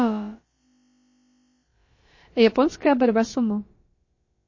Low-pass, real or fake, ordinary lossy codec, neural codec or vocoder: 7.2 kHz; fake; MP3, 32 kbps; codec, 16 kHz, about 1 kbps, DyCAST, with the encoder's durations